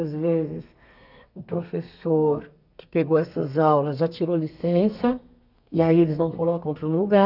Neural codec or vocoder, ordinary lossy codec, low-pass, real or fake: codec, 32 kHz, 1.9 kbps, SNAC; none; 5.4 kHz; fake